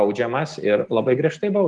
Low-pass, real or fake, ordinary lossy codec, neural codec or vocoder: 10.8 kHz; real; Opus, 24 kbps; none